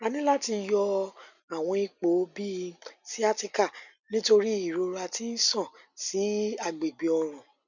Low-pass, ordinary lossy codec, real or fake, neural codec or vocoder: 7.2 kHz; none; real; none